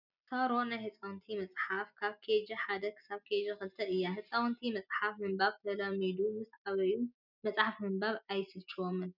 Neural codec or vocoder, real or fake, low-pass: none; real; 5.4 kHz